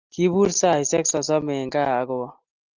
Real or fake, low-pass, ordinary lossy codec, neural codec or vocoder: real; 7.2 kHz; Opus, 24 kbps; none